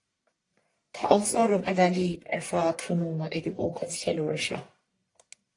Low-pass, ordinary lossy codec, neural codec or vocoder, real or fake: 10.8 kHz; AAC, 48 kbps; codec, 44.1 kHz, 1.7 kbps, Pupu-Codec; fake